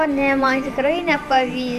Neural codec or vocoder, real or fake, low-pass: vocoder, 44.1 kHz, 128 mel bands every 512 samples, BigVGAN v2; fake; 14.4 kHz